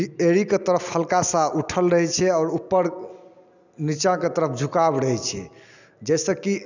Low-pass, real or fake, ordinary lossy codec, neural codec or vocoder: 7.2 kHz; real; none; none